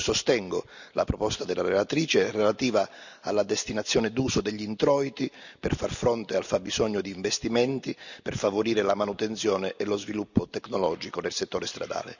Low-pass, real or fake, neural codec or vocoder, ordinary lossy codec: 7.2 kHz; real; none; none